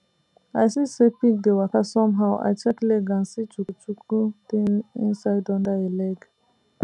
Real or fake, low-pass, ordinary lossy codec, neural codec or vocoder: real; 10.8 kHz; none; none